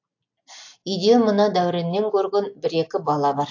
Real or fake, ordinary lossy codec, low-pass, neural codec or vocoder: real; AAC, 48 kbps; 7.2 kHz; none